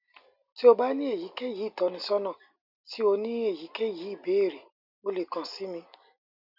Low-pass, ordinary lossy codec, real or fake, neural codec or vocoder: 5.4 kHz; none; real; none